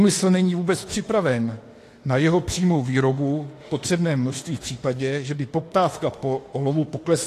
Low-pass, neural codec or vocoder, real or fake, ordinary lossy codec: 14.4 kHz; autoencoder, 48 kHz, 32 numbers a frame, DAC-VAE, trained on Japanese speech; fake; AAC, 48 kbps